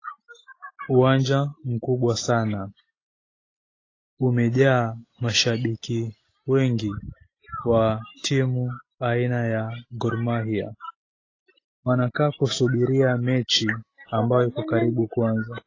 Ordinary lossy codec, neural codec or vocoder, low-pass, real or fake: AAC, 32 kbps; none; 7.2 kHz; real